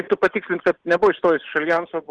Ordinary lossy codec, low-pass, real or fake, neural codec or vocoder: Opus, 16 kbps; 9.9 kHz; real; none